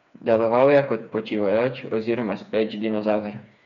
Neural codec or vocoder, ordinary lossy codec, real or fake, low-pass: codec, 16 kHz, 4 kbps, FreqCodec, smaller model; none; fake; 7.2 kHz